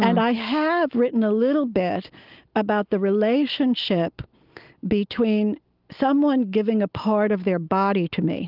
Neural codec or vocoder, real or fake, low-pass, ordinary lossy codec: none; real; 5.4 kHz; Opus, 32 kbps